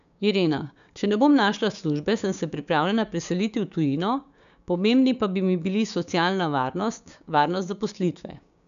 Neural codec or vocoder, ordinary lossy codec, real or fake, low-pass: codec, 16 kHz, 6 kbps, DAC; none; fake; 7.2 kHz